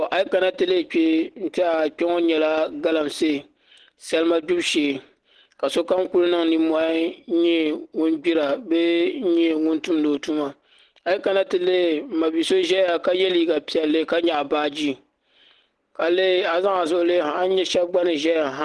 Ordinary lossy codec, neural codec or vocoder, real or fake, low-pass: Opus, 16 kbps; none; real; 10.8 kHz